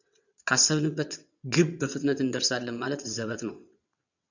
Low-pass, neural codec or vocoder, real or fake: 7.2 kHz; vocoder, 22.05 kHz, 80 mel bands, WaveNeXt; fake